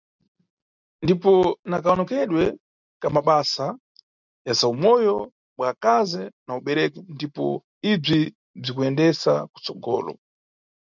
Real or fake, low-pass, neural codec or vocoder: real; 7.2 kHz; none